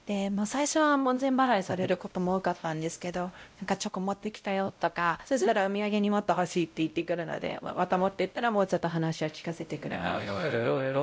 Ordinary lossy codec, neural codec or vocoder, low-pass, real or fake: none; codec, 16 kHz, 0.5 kbps, X-Codec, WavLM features, trained on Multilingual LibriSpeech; none; fake